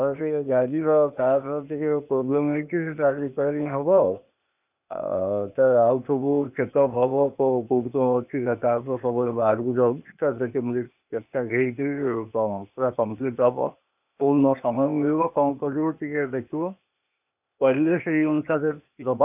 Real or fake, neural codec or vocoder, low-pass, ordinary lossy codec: fake; codec, 16 kHz, 0.8 kbps, ZipCodec; 3.6 kHz; none